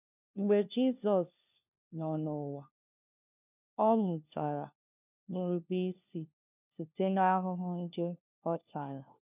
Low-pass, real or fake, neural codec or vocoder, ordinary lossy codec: 3.6 kHz; fake; codec, 16 kHz, 1 kbps, FunCodec, trained on LibriTTS, 50 frames a second; none